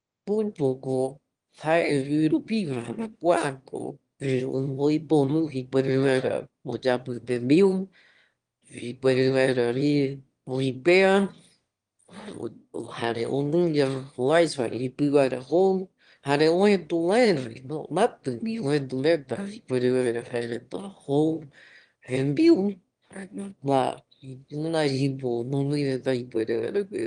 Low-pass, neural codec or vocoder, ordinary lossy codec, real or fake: 9.9 kHz; autoencoder, 22.05 kHz, a latent of 192 numbers a frame, VITS, trained on one speaker; Opus, 24 kbps; fake